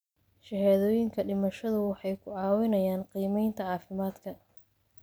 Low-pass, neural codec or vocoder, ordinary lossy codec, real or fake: none; none; none; real